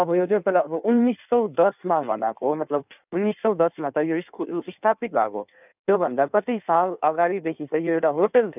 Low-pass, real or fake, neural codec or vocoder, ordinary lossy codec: 3.6 kHz; fake; codec, 16 kHz in and 24 kHz out, 1.1 kbps, FireRedTTS-2 codec; none